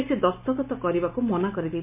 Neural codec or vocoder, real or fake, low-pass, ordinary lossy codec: none; real; 3.6 kHz; MP3, 24 kbps